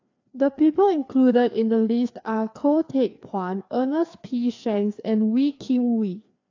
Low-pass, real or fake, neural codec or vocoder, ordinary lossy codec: 7.2 kHz; fake; codec, 16 kHz, 2 kbps, FreqCodec, larger model; AAC, 48 kbps